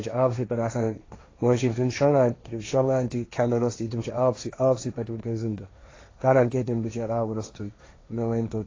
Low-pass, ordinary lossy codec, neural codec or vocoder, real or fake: 7.2 kHz; AAC, 32 kbps; codec, 16 kHz, 1.1 kbps, Voila-Tokenizer; fake